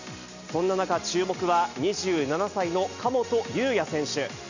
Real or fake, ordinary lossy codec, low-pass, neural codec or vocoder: real; none; 7.2 kHz; none